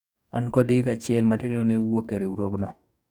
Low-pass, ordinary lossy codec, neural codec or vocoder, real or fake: 19.8 kHz; none; codec, 44.1 kHz, 2.6 kbps, DAC; fake